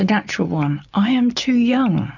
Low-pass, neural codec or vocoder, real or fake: 7.2 kHz; none; real